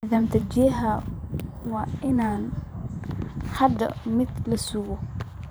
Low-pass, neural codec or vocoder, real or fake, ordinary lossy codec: none; vocoder, 44.1 kHz, 128 mel bands every 512 samples, BigVGAN v2; fake; none